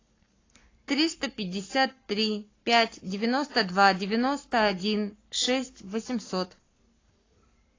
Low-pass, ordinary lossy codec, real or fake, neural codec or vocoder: 7.2 kHz; AAC, 32 kbps; fake; codec, 44.1 kHz, 7.8 kbps, Pupu-Codec